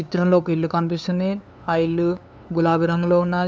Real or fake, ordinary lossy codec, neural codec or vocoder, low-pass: fake; none; codec, 16 kHz, 2 kbps, FunCodec, trained on LibriTTS, 25 frames a second; none